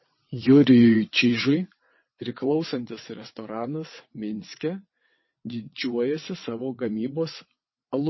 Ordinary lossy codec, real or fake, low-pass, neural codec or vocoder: MP3, 24 kbps; fake; 7.2 kHz; vocoder, 22.05 kHz, 80 mel bands, WaveNeXt